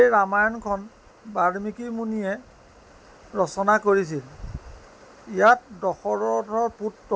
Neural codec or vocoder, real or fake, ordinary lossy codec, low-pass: none; real; none; none